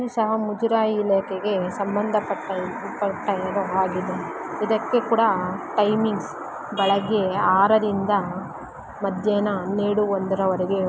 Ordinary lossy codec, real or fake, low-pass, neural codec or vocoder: none; real; none; none